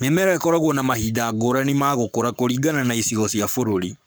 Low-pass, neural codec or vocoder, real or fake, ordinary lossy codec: none; codec, 44.1 kHz, 7.8 kbps, Pupu-Codec; fake; none